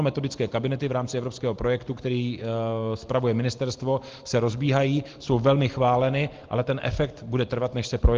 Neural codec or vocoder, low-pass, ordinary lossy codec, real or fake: none; 7.2 kHz; Opus, 16 kbps; real